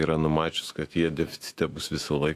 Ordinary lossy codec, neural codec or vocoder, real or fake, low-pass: AAC, 64 kbps; autoencoder, 48 kHz, 128 numbers a frame, DAC-VAE, trained on Japanese speech; fake; 14.4 kHz